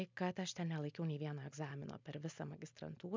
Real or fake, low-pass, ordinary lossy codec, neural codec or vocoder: real; 7.2 kHz; MP3, 64 kbps; none